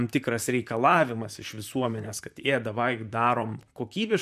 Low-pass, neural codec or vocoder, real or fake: 14.4 kHz; vocoder, 44.1 kHz, 128 mel bands, Pupu-Vocoder; fake